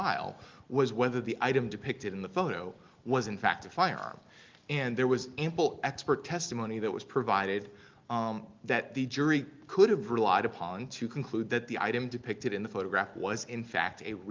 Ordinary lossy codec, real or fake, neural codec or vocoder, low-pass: Opus, 32 kbps; real; none; 7.2 kHz